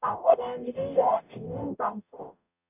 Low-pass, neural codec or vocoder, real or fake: 3.6 kHz; codec, 44.1 kHz, 0.9 kbps, DAC; fake